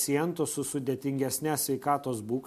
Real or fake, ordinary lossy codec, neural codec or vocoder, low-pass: real; MP3, 64 kbps; none; 14.4 kHz